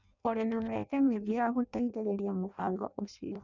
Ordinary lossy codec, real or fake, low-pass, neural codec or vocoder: none; fake; 7.2 kHz; codec, 16 kHz in and 24 kHz out, 0.6 kbps, FireRedTTS-2 codec